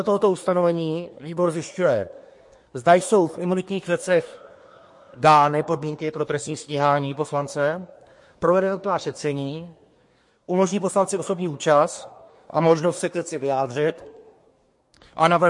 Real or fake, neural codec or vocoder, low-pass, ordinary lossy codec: fake; codec, 24 kHz, 1 kbps, SNAC; 10.8 kHz; MP3, 48 kbps